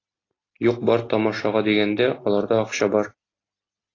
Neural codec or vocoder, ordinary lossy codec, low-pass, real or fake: none; AAC, 32 kbps; 7.2 kHz; real